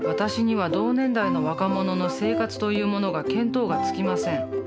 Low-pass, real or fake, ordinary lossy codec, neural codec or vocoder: none; real; none; none